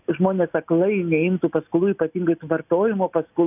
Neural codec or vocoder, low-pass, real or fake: none; 3.6 kHz; real